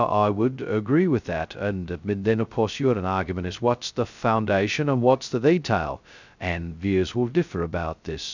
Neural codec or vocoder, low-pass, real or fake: codec, 16 kHz, 0.2 kbps, FocalCodec; 7.2 kHz; fake